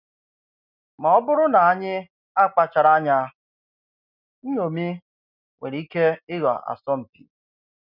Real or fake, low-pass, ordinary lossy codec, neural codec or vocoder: real; 5.4 kHz; none; none